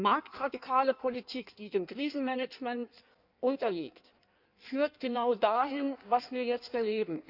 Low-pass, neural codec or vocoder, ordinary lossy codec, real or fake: 5.4 kHz; codec, 16 kHz in and 24 kHz out, 1.1 kbps, FireRedTTS-2 codec; none; fake